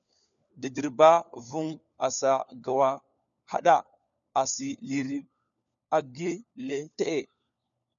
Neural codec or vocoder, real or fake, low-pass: codec, 16 kHz, 4 kbps, FunCodec, trained on LibriTTS, 50 frames a second; fake; 7.2 kHz